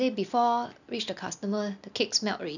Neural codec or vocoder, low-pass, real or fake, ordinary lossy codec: none; 7.2 kHz; real; none